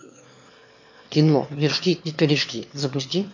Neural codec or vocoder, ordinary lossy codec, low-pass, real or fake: autoencoder, 22.05 kHz, a latent of 192 numbers a frame, VITS, trained on one speaker; MP3, 48 kbps; 7.2 kHz; fake